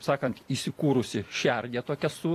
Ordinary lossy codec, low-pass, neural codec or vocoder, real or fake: AAC, 48 kbps; 14.4 kHz; vocoder, 44.1 kHz, 128 mel bands every 256 samples, BigVGAN v2; fake